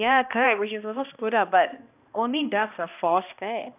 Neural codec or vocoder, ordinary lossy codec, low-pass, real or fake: codec, 16 kHz, 1 kbps, X-Codec, HuBERT features, trained on balanced general audio; none; 3.6 kHz; fake